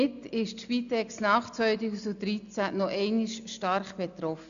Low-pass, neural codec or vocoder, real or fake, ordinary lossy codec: 7.2 kHz; none; real; none